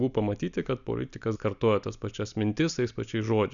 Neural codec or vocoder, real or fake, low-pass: none; real; 7.2 kHz